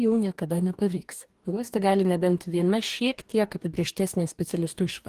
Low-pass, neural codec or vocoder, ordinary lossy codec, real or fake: 14.4 kHz; codec, 44.1 kHz, 2.6 kbps, DAC; Opus, 24 kbps; fake